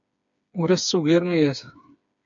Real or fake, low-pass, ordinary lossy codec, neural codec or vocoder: fake; 7.2 kHz; MP3, 64 kbps; codec, 16 kHz, 4 kbps, FreqCodec, smaller model